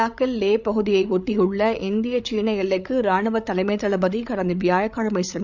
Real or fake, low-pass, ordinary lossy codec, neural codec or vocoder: fake; 7.2 kHz; none; codec, 16 kHz, 8 kbps, FreqCodec, larger model